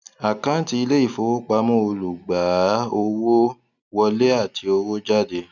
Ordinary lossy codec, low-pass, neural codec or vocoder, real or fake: none; 7.2 kHz; none; real